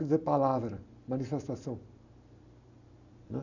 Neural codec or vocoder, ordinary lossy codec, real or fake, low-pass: none; none; real; 7.2 kHz